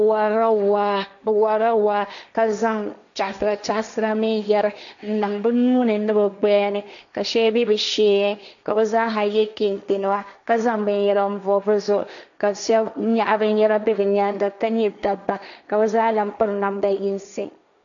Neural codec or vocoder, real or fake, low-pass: codec, 16 kHz, 1.1 kbps, Voila-Tokenizer; fake; 7.2 kHz